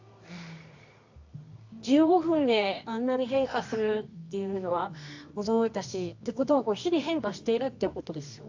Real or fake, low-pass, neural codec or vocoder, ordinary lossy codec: fake; 7.2 kHz; codec, 24 kHz, 0.9 kbps, WavTokenizer, medium music audio release; AAC, 48 kbps